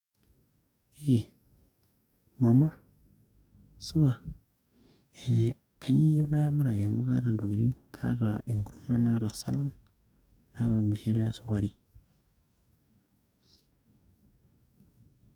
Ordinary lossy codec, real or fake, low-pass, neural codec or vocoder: none; fake; 19.8 kHz; codec, 44.1 kHz, 2.6 kbps, DAC